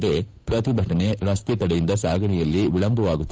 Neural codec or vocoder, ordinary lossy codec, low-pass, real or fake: codec, 16 kHz, 8 kbps, FunCodec, trained on Chinese and English, 25 frames a second; none; none; fake